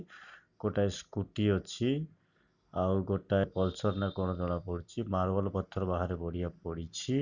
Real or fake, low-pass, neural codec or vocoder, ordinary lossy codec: real; 7.2 kHz; none; none